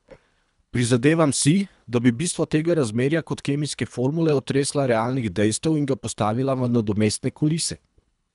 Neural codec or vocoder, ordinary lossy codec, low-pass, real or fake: codec, 24 kHz, 3 kbps, HILCodec; none; 10.8 kHz; fake